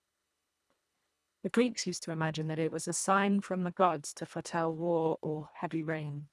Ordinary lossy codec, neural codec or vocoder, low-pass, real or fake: none; codec, 24 kHz, 1.5 kbps, HILCodec; none; fake